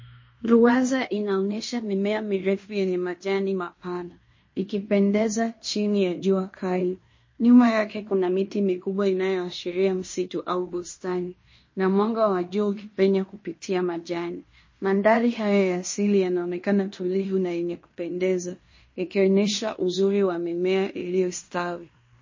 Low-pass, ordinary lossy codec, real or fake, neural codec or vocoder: 7.2 kHz; MP3, 32 kbps; fake; codec, 16 kHz in and 24 kHz out, 0.9 kbps, LongCat-Audio-Codec, fine tuned four codebook decoder